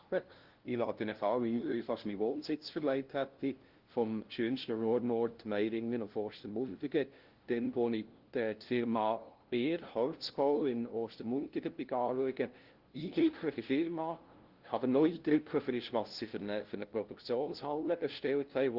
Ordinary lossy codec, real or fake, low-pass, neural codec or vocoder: Opus, 16 kbps; fake; 5.4 kHz; codec, 16 kHz, 0.5 kbps, FunCodec, trained on LibriTTS, 25 frames a second